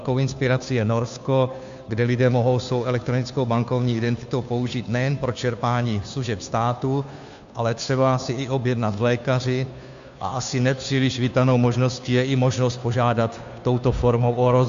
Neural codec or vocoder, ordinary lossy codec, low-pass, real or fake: codec, 16 kHz, 2 kbps, FunCodec, trained on Chinese and English, 25 frames a second; MP3, 64 kbps; 7.2 kHz; fake